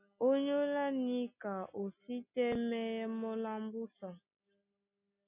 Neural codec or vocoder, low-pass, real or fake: none; 3.6 kHz; real